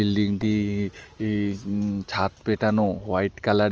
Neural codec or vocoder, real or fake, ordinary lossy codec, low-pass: none; real; Opus, 24 kbps; 7.2 kHz